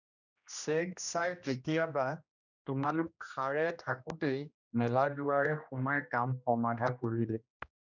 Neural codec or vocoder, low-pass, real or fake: codec, 16 kHz, 1 kbps, X-Codec, HuBERT features, trained on general audio; 7.2 kHz; fake